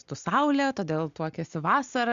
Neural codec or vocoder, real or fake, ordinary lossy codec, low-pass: none; real; Opus, 64 kbps; 7.2 kHz